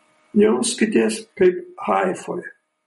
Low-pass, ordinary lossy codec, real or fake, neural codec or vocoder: 19.8 kHz; MP3, 48 kbps; real; none